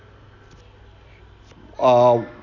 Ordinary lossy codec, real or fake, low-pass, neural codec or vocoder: none; real; 7.2 kHz; none